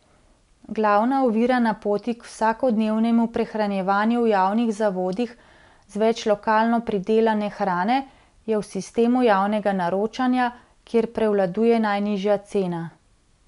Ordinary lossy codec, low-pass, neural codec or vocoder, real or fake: none; 10.8 kHz; none; real